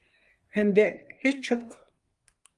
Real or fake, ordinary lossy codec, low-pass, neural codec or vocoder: fake; Opus, 32 kbps; 10.8 kHz; codec, 24 kHz, 1 kbps, SNAC